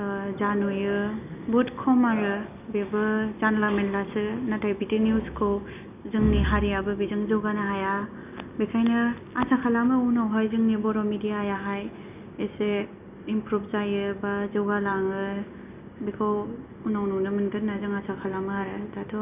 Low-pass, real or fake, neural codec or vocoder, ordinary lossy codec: 3.6 kHz; real; none; none